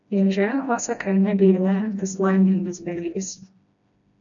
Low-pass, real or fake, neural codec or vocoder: 7.2 kHz; fake; codec, 16 kHz, 1 kbps, FreqCodec, smaller model